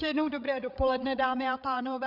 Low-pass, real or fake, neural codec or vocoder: 5.4 kHz; fake; codec, 16 kHz, 8 kbps, FreqCodec, larger model